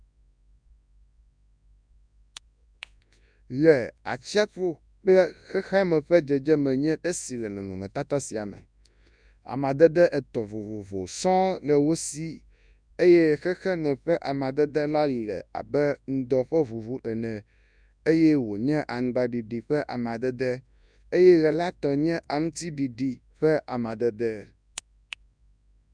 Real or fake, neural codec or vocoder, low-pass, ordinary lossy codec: fake; codec, 24 kHz, 0.9 kbps, WavTokenizer, large speech release; 9.9 kHz; none